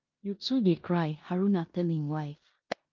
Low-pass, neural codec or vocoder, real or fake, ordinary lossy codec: 7.2 kHz; codec, 16 kHz in and 24 kHz out, 0.9 kbps, LongCat-Audio-Codec, four codebook decoder; fake; Opus, 24 kbps